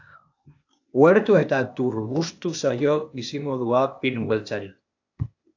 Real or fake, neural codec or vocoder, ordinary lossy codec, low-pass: fake; codec, 16 kHz, 0.8 kbps, ZipCodec; AAC, 48 kbps; 7.2 kHz